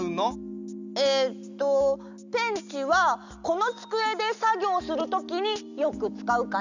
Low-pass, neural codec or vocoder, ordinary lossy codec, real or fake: 7.2 kHz; none; none; real